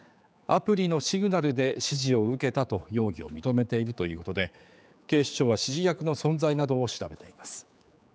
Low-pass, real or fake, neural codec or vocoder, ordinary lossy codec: none; fake; codec, 16 kHz, 4 kbps, X-Codec, HuBERT features, trained on general audio; none